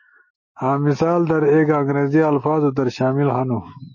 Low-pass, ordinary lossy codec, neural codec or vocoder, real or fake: 7.2 kHz; MP3, 32 kbps; autoencoder, 48 kHz, 128 numbers a frame, DAC-VAE, trained on Japanese speech; fake